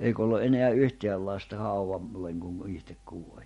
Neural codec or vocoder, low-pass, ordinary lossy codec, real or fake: none; 14.4 kHz; MP3, 48 kbps; real